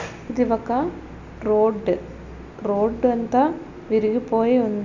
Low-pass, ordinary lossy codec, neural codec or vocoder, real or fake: 7.2 kHz; none; none; real